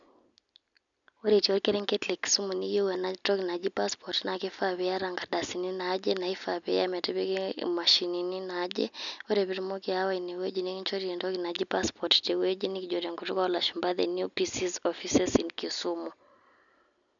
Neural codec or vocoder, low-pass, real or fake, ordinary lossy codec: none; 7.2 kHz; real; none